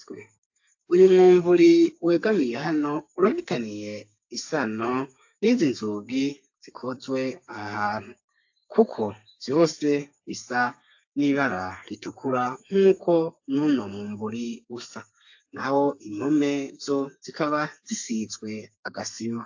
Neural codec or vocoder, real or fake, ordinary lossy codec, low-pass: codec, 32 kHz, 1.9 kbps, SNAC; fake; AAC, 48 kbps; 7.2 kHz